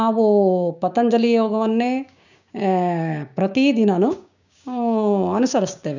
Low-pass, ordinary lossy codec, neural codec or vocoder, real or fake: 7.2 kHz; none; codec, 16 kHz, 6 kbps, DAC; fake